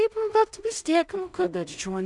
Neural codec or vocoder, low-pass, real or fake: codec, 16 kHz in and 24 kHz out, 0.4 kbps, LongCat-Audio-Codec, two codebook decoder; 10.8 kHz; fake